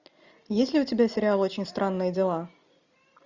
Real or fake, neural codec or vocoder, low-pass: real; none; 7.2 kHz